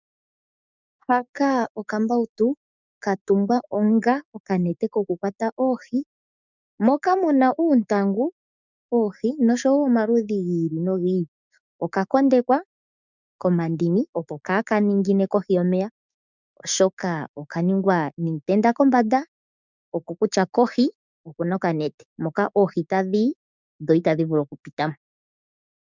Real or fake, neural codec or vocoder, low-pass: fake; codec, 16 kHz, 6 kbps, DAC; 7.2 kHz